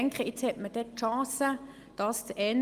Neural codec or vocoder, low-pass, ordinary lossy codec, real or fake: none; 14.4 kHz; Opus, 32 kbps; real